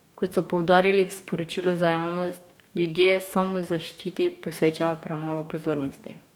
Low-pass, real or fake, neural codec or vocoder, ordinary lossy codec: 19.8 kHz; fake; codec, 44.1 kHz, 2.6 kbps, DAC; none